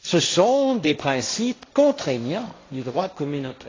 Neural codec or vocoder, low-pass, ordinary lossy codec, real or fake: codec, 16 kHz, 1.1 kbps, Voila-Tokenizer; 7.2 kHz; AAC, 32 kbps; fake